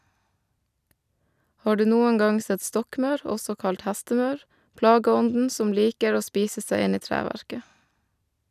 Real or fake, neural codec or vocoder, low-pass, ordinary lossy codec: real; none; 14.4 kHz; none